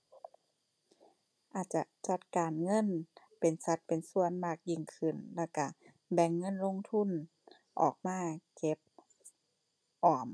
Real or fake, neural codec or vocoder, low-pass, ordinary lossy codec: real; none; none; none